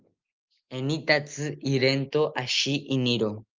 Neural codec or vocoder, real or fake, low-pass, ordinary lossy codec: none; real; 7.2 kHz; Opus, 24 kbps